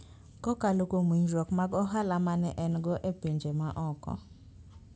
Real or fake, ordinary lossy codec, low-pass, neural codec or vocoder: real; none; none; none